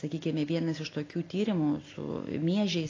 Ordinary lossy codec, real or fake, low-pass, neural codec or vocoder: AAC, 32 kbps; real; 7.2 kHz; none